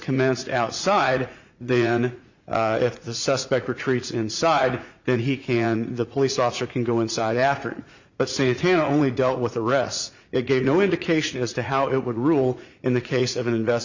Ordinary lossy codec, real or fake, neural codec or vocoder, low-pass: Opus, 64 kbps; fake; vocoder, 44.1 kHz, 80 mel bands, Vocos; 7.2 kHz